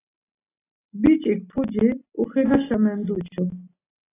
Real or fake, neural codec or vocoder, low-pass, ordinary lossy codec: real; none; 3.6 kHz; AAC, 16 kbps